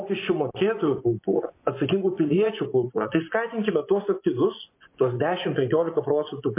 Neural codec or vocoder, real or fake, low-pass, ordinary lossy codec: vocoder, 24 kHz, 100 mel bands, Vocos; fake; 3.6 kHz; MP3, 24 kbps